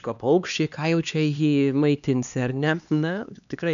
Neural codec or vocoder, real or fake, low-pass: codec, 16 kHz, 2 kbps, X-Codec, HuBERT features, trained on LibriSpeech; fake; 7.2 kHz